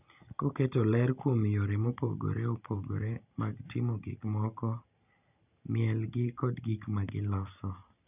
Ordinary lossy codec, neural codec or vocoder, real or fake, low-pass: none; none; real; 3.6 kHz